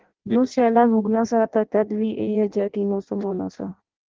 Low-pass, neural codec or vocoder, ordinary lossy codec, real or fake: 7.2 kHz; codec, 16 kHz in and 24 kHz out, 0.6 kbps, FireRedTTS-2 codec; Opus, 16 kbps; fake